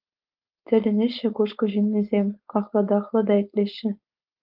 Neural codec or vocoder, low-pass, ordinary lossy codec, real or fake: codec, 16 kHz, 4.8 kbps, FACodec; 5.4 kHz; Opus, 24 kbps; fake